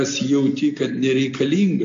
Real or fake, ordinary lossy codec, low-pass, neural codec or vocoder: real; AAC, 64 kbps; 7.2 kHz; none